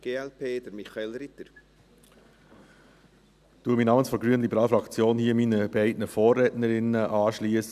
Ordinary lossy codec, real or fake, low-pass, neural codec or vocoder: none; real; 14.4 kHz; none